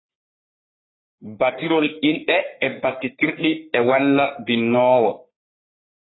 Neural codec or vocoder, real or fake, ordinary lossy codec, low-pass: codec, 44.1 kHz, 3.4 kbps, Pupu-Codec; fake; AAC, 16 kbps; 7.2 kHz